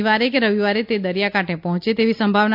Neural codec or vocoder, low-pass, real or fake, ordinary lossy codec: none; 5.4 kHz; real; MP3, 48 kbps